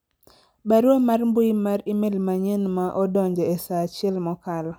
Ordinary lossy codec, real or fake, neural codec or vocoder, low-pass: none; real; none; none